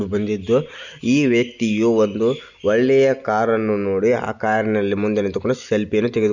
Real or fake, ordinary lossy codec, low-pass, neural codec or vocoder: real; none; 7.2 kHz; none